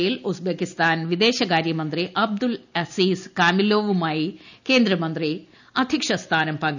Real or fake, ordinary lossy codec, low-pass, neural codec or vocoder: real; none; 7.2 kHz; none